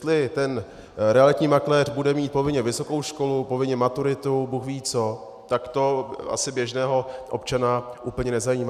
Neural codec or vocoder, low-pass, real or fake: none; 14.4 kHz; real